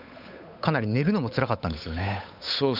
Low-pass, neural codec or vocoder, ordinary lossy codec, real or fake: 5.4 kHz; codec, 16 kHz, 8 kbps, FunCodec, trained on Chinese and English, 25 frames a second; none; fake